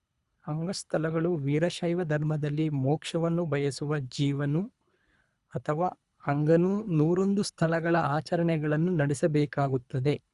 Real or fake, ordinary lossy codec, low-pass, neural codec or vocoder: fake; Opus, 64 kbps; 10.8 kHz; codec, 24 kHz, 3 kbps, HILCodec